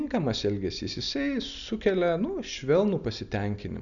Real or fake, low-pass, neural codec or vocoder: real; 7.2 kHz; none